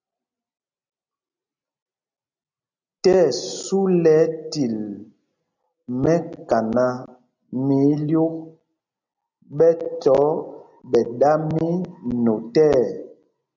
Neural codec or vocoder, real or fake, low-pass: none; real; 7.2 kHz